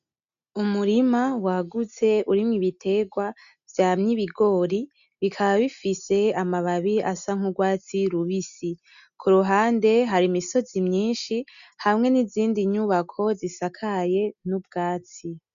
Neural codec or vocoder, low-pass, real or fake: none; 7.2 kHz; real